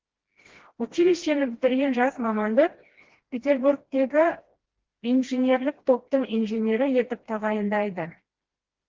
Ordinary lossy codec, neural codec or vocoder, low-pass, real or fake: Opus, 16 kbps; codec, 16 kHz, 1 kbps, FreqCodec, smaller model; 7.2 kHz; fake